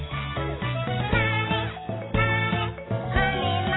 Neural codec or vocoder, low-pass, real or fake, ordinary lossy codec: none; 7.2 kHz; real; AAC, 16 kbps